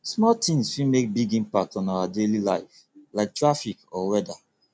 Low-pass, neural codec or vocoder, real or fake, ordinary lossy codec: none; none; real; none